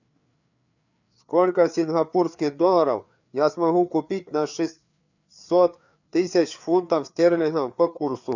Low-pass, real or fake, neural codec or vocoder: 7.2 kHz; fake; codec, 16 kHz, 4 kbps, FreqCodec, larger model